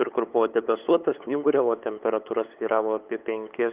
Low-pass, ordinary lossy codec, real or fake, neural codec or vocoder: 3.6 kHz; Opus, 32 kbps; fake; codec, 16 kHz, 8 kbps, FunCodec, trained on LibriTTS, 25 frames a second